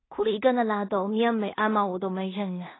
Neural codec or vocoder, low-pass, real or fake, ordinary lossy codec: codec, 16 kHz in and 24 kHz out, 0.4 kbps, LongCat-Audio-Codec, two codebook decoder; 7.2 kHz; fake; AAC, 16 kbps